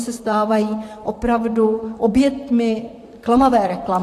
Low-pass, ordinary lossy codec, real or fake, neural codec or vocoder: 14.4 kHz; AAC, 64 kbps; fake; vocoder, 44.1 kHz, 128 mel bands, Pupu-Vocoder